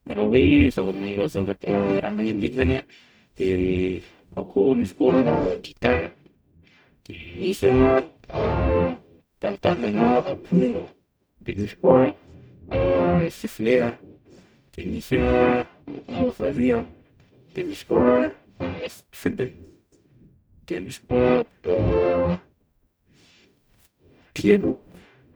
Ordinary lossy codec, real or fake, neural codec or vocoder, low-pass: none; fake; codec, 44.1 kHz, 0.9 kbps, DAC; none